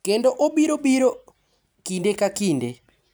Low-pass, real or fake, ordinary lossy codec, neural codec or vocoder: none; real; none; none